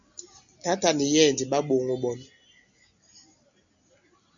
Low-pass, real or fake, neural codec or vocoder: 7.2 kHz; real; none